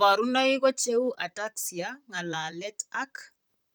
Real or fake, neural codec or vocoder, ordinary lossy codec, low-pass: fake; vocoder, 44.1 kHz, 128 mel bands, Pupu-Vocoder; none; none